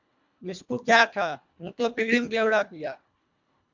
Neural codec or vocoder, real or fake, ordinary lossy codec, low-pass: codec, 24 kHz, 1.5 kbps, HILCodec; fake; MP3, 64 kbps; 7.2 kHz